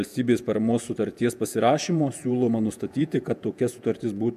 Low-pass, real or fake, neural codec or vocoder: 14.4 kHz; fake; vocoder, 48 kHz, 128 mel bands, Vocos